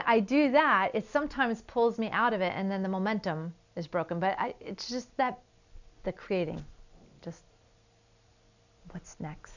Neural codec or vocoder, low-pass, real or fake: none; 7.2 kHz; real